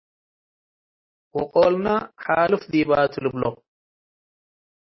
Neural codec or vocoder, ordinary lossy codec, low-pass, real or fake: none; MP3, 24 kbps; 7.2 kHz; real